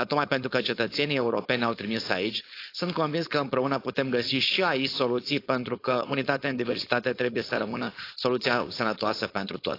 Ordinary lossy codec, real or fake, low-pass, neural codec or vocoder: AAC, 32 kbps; fake; 5.4 kHz; codec, 16 kHz, 4.8 kbps, FACodec